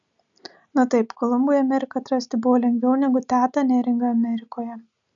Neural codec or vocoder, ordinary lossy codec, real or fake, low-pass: none; MP3, 96 kbps; real; 7.2 kHz